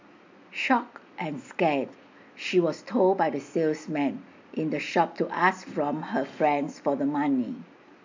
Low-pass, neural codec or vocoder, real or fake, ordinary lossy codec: 7.2 kHz; none; real; MP3, 64 kbps